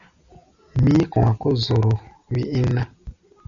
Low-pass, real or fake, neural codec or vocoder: 7.2 kHz; real; none